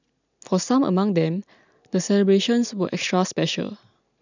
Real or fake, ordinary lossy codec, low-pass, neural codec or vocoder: real; none; 7.2 kHz; none